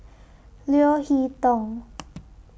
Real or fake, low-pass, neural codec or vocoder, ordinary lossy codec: real; none; none; none